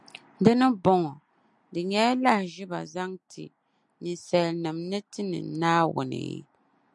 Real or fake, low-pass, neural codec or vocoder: real; 10.8 kHz; none